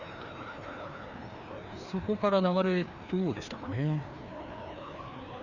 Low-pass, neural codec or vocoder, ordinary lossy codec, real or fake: 7.2 kHz; codec, 16 kHz, 2 kbps, FreqCodec, larger model; none; fake